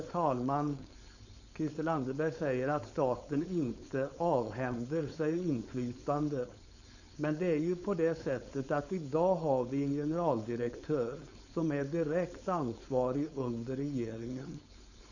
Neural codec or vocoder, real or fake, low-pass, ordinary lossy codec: codec, 16 kHz, 4.8 kbps, FACodec; fake; 7.2 kHz; none